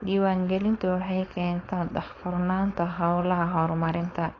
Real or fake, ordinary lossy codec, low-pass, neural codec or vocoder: fake; AAC, 32 kbps; 7.2 kHz; codec, 16 kHz, 4.8 kbps, FACodec